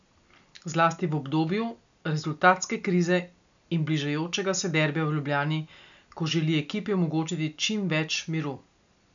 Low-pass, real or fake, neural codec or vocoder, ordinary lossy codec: 7.2 kHz; real; none; none